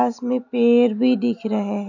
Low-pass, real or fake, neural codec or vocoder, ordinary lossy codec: 7.2 kHz; real; none; none